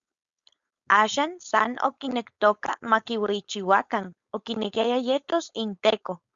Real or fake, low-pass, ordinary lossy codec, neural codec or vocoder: fake; 7.2 kHz; Opus, 64 kbps; codec, 16 kHz, 4.8 kbps, FACodec